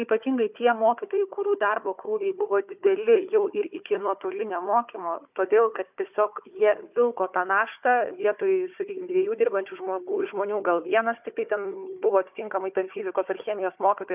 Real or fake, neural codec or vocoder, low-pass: fake; codec, 16 kHz, 4 kbps, FunCodec, trained on Chinese and English, 50 frames a second; 3.6 kHz